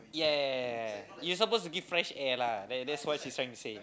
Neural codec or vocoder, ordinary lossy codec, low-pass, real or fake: none; none; none; real